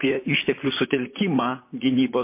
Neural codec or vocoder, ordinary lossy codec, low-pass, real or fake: vocoder, 44.1 kHz, 128 mel bands, Pupu-Vocoder; MP3, 24 kbps; 3.6 kHz; fake